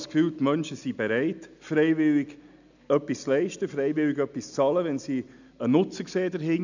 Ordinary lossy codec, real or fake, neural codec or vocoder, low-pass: none; real; none; 7.2 kHz